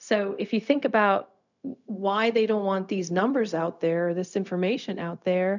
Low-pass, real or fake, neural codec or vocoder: 7.2 kHz; fake; codec, 16 kHz, 0.4 kbps, LongCat-Audio-Codec